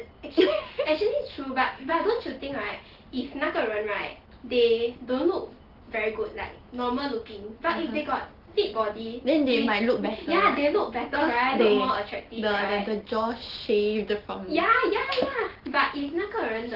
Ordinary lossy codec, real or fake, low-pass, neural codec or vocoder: Opus, 16 kbps; real; 5.4 kHz; none